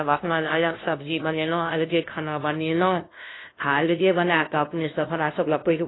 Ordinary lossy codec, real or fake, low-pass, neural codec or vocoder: AAC, 16 kbps; fake; 7.2 kHz; codec, 16 kHz, 0.5 kbps, FunCodec, trained on LibriTTS, 25 frames a second